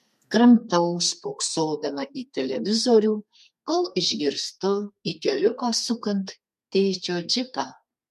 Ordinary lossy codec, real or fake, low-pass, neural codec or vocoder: MP3, 64 kbps; fake; 14.4 kHz; codec, 32 kHz, 1.9 kbps, SNAC